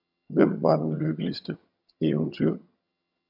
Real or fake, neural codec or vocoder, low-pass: fake; vocoder, 22.05 kHz, 80 mel bands, HiFi-GAN; 5.4 kHz